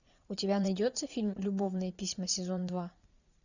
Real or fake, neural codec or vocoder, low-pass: fake; vocoder, 44.1 kHz, 80 mel bands, Vocos; 7.2 kHz